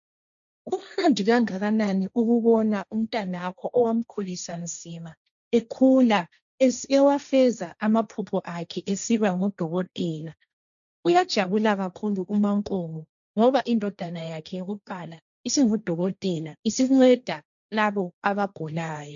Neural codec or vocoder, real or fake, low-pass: codec, 16 kHz, 1.1 kbps, Voila-Tokenizer; fake; 7.2 kHz